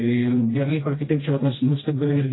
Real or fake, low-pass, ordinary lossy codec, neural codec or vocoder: fake; 7.2 kHz; AAC, 16 kbps; codec, 16 kHz, 1 kbps, FreqCodec, smaller model